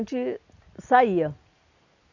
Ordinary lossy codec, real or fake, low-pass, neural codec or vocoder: none; real; 7.2 kHz; none